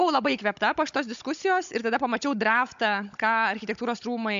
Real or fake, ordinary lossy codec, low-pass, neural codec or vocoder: fake; MP3, 64 kbps; 7.2 kHz; codec, 16 kHz, 16 kbps, FunCodec, trained on Chinese and English, 50 frames a second